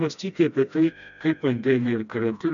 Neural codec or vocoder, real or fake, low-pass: codec, 16 kHz, 1 kbps, FreqCodec, smaller model; fake; 7.2 kHz